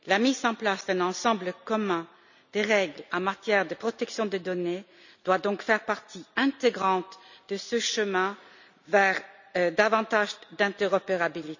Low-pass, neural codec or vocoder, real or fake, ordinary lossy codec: 7.2 kHz; none; real; none